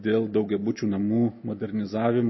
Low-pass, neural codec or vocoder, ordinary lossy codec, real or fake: 7.2 kHz; none; MP3, 24 kbps; real